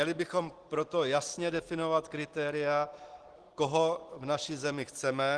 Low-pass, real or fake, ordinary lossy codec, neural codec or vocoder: 10.8 kHz; real; Opus, 16 kbps; none